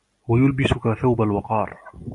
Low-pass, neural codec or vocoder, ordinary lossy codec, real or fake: 10.8 kHz; none; AAC, 64 kbps; real